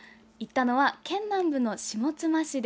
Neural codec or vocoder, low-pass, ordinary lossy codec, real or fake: none; none; none; real